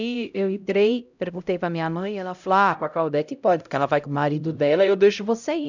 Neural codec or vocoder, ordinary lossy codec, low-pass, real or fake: codec, 16 kHz, 0.5 kbps, X-Codec, HuBERT features, trained on LibriSpeech; none; 7.2 kHz; fake